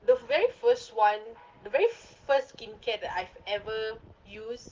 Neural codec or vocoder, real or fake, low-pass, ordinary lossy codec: none; real; 7.2 kHz; Opus, 16 kbps